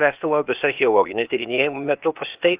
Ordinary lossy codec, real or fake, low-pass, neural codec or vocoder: Opus, 24 kbps; fake; 3.6 kHz; codec, 16 kHz, 0.8 kbps, ZipCodec